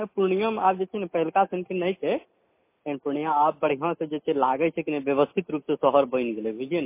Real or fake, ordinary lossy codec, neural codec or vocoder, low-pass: real; MP3, 24 kbps; none; 3.6 kHz